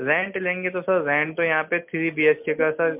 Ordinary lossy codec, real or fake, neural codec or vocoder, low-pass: MP3, 32 kbps; real; none; 3.6 kHz